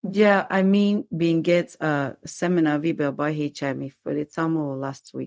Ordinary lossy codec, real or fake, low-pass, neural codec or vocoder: none; fake; none; codec, 16 kHz, 0.4 kbps, LongCat-Audio-Codec